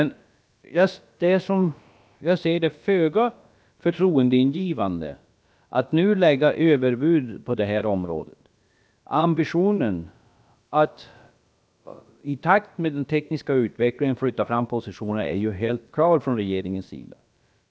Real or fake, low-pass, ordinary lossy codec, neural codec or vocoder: fake; none; none; codec, 16 kHz, about 1 kbps, DyCAST, with the encoder's durations